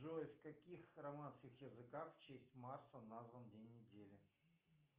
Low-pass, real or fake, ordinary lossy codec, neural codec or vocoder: 3.6 kHz; real; Opus, 24 kbps; none